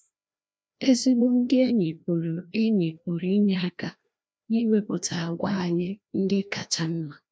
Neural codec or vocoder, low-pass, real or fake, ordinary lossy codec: codec, 16 kHz, 1 kbps, FreqCodec, larger model; none; fake; none